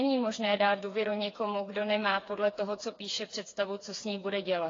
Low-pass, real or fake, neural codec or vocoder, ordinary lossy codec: 7.2 kHz; fake; codec, 16 kHz, 4 kbps, FreqCodec, smaller model; AAC, 32 kbps